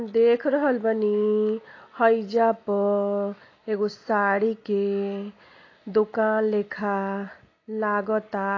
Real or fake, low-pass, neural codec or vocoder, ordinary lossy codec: real; 7.2 kHz; none; AAC, 32 kbps